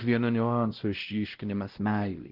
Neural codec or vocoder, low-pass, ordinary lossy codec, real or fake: codec, 16 kHz, 0.5 kbps, X-Codec, WavLM features, trained on Multilingual LibriSpeech; 5.4 kHz; Opus, 16 kbps; fake